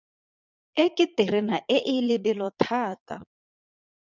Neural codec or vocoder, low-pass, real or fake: vocoder, 22.05 kHz, 80 mel bands, Vocos; 7.2 kHz; fake